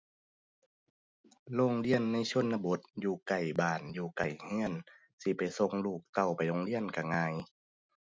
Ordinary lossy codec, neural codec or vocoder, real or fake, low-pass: none; none; real; none